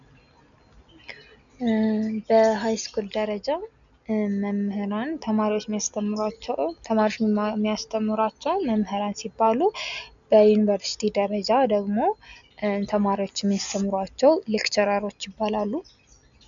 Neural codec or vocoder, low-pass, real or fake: none; 7.2 kHz; real